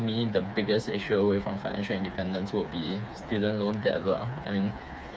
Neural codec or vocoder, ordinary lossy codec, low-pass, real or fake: codec, 16 kHz, 4 kbps, FreqCodec, smaller model; none; none; fake